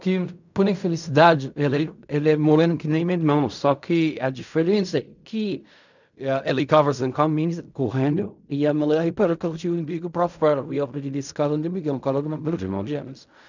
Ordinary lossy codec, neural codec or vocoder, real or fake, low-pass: none; codec, 16 kHz in and 24 kHz out, 0.4 kbps, LongCat-Audio-Codec, fine tuned four codebook decoder; fake; 7.2 kHz